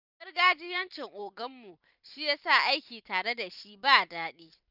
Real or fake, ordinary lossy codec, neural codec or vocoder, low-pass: fake; none; vocoder, 44.1 kHz, 128 mel bands every 256 samples, BigVGAN v2; 5.4 kHz